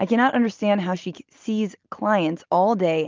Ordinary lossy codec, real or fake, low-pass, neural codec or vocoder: Opus, 24 kbps; real; 7.2 kHz; none